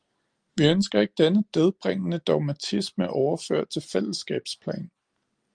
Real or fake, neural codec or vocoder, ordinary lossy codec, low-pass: real; none; Opus, 32 kbps; 9.9 kHz